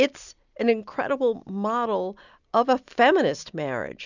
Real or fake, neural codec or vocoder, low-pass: real; none; 7.2 kHz